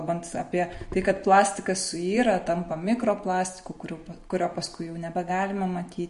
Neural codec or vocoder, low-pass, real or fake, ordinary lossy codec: none; 10.8 kHz; real; MP3, 48 kbps